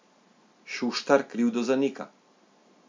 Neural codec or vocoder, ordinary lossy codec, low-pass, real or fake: none; MP3, 48 kbps; 7.2 kHz; real